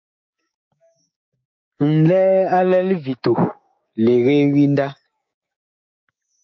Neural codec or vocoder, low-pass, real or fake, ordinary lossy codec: codec, 16 kHz, 6 kbps, DAC; 7.2 kHz; fake; MP3, 48 kbps